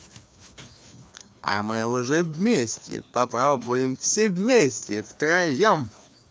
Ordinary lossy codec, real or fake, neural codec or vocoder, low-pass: none; fake; codec, 16 kHz, 2 kbps, FreqCodec, larger model; none